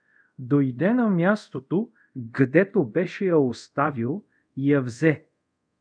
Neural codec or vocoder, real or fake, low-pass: codec, 24 kHz, 0.5 kbps, DualCodec; fake; 9.9 kHz